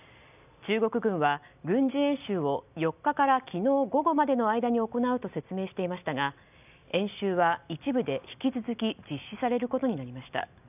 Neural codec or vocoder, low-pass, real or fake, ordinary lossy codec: none; 3.6 kHz; real; none